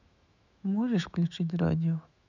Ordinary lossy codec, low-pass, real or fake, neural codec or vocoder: none; 7.2 kHz; fake; autoencoder, 48 kHz, 128 numbers a frame, DAC-VAE, trained on Japanese speech